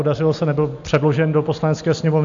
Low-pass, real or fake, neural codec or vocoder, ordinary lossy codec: 7.2 kHz; real; none; AAC, 64 kbps